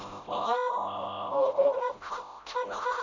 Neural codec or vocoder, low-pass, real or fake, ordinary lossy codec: codec, 16 kHz, 0.5 kbps, FreqCodec, smaller model; 7.2 kHz; fake; AAC, 48 kbps